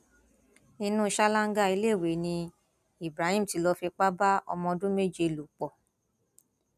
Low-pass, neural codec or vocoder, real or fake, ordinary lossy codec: 14.4 kHz; none; real; none